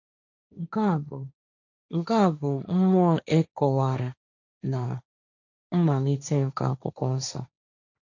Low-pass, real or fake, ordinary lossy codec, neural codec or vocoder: 7.2 kHz; fake; none; codec, 16 kHz, 1.1 kbps, Voila-Tokenizer